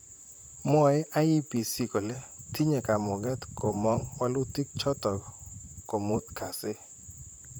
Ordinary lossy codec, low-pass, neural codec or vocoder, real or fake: none; none; vocoder, 44.1 kHz, 128 mel bands, Pupu-Vocoder; fake